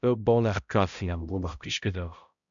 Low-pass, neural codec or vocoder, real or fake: 7.2 kHz; codec, 16 kHz, 0.5 kbps, X-Codec, HuBERT features, trained on balanced general audio; fake